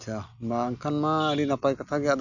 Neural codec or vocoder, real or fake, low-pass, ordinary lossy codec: none; real; 7.2 kHz; none